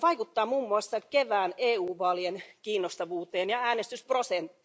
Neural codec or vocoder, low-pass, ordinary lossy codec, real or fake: none; none; none; real